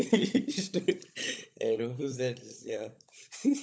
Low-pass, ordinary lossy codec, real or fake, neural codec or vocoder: none; none; fake; codec, 16 kHz, 16 kbps, FunCodec, trained on LibriTTS, 50 frames a second